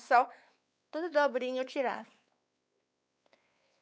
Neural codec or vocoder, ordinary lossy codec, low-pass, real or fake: codec, 16 kHz, 4 kbps, X-Codec, WavLM features, trained on Multilingual LibriSpeech; none; none; fake